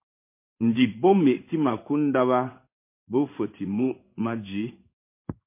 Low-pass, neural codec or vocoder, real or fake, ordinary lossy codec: 3.6 kHz; codec, 16 kHz in and 24 kHz out, 1 kbps, XY-Tokenizer; fake; MP3, 24 kbps